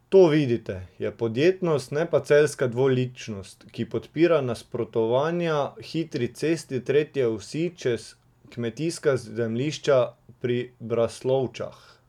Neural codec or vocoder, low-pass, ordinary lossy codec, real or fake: none; 19.8 kHz; none; real